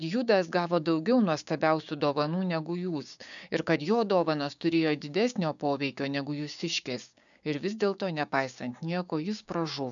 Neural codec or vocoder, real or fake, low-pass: codec, 16 kHz, 6 kbps, DAC; fake; 7.2 kHz